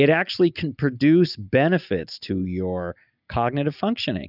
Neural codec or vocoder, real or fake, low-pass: none; real; 5.4 kHz